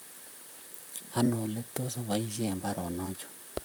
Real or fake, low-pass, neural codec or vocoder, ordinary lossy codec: fake; none; vocoder, 44.1 kHz, 128 mel bands, Pupu-Vocoder; none